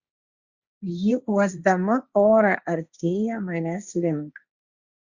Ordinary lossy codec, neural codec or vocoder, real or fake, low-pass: Opus, 64 kbps; codec, 16 kHz, 1.1 kbps, Voila-Tokenizer; fake; 7.2 kHz